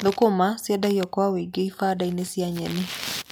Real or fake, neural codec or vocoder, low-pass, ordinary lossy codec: real; none; none; none